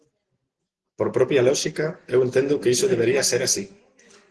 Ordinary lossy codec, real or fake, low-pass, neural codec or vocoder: Opus, 16 kbps; real; 10.8 kHz; none